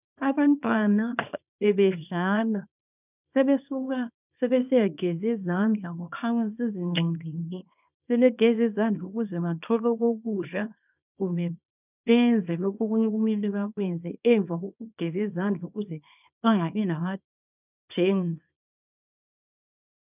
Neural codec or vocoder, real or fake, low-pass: codec, 24 kHz, 0.9 kbps, WavTokenizer, small release; fake; 3.6 kHz